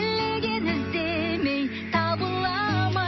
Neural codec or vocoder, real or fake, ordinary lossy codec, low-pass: none; real; MP3, 24 kbps; 7.2 kHz